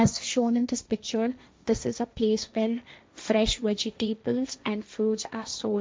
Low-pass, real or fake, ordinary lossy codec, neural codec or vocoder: none; fake; none; codec, 16 kHz, 1.1 kbps, Voila-Tokenizer